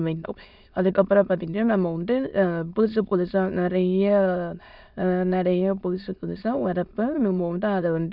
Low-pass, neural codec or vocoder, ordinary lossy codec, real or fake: 5.4 kHz; autoencoder, 22.05 kHz, a latent of 192 numbers a frame, VITS, trained on many speakers; none; fake